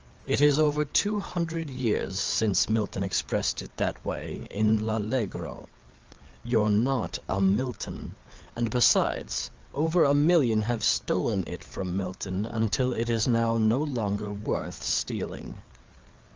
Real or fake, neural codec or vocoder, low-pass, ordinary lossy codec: fake; codec, 16 kHz, 4 kbps, FreqCodec, larger model; 7.2 kHz; Opus, 24 kbps